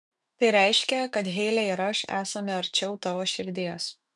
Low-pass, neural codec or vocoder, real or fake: 10.8 kHz; autoencoder, 48 kHz, 128 numbers a frame, DAC-VAE, trained on Japanese speech; fake